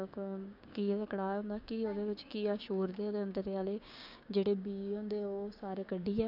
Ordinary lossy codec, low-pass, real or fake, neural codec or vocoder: none; 5.4 kHz; fake; codec, 16 kHz, 6 kbps, DAC